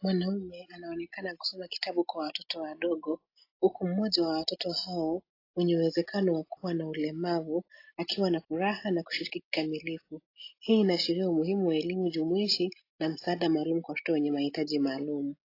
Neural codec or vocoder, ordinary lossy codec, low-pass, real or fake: none; AAC, 32 kbps; 5.4 kHz; real